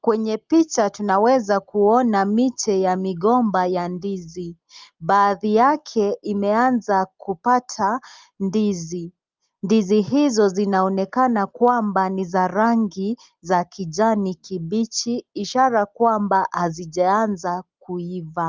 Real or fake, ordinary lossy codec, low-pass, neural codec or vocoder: real; Opus, 24 kbps; 7.2 kHz; none